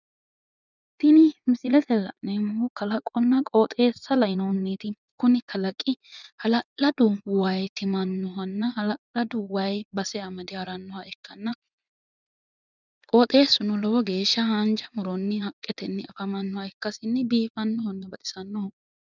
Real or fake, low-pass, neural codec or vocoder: fake; 7.2 kHz; vocoder, 24 kHz, 100 mel bands, Vocos